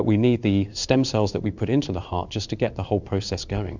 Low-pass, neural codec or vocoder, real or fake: 7.2 kHz; codec, 16 kHz in and 24 kHz out, 1 kbps, XY-Tokenizer; fake